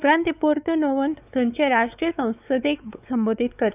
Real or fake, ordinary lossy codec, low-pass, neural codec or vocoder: fake; none; 3.6 kHz; codec, 16 kHz, 4 kbps, X-Codec, WavLM features, trained on Multilingual LibriSpeech